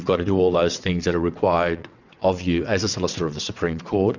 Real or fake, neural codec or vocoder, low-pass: fake; vocoder, 22.05 kHz, 80 mel bands, WaveNeXt; 7.2 kHz